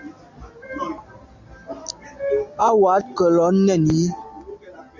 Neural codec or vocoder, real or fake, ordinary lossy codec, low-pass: none; real; MP3, 64 kbps; 7.2 kHz